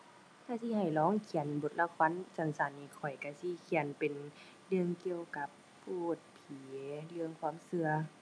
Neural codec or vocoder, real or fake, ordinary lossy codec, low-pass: none; real; none; none